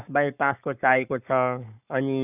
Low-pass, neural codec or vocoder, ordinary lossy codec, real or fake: 3.6 kHz; codec, 44.1 kHz, 7.8 kbps, Pupu-Codec; none; fake